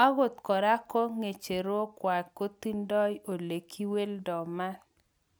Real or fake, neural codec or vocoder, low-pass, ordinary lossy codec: real; none; none; none